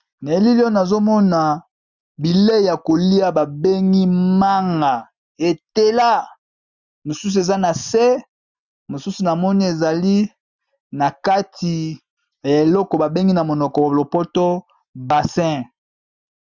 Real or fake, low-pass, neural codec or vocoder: real; 7.2 kHz; none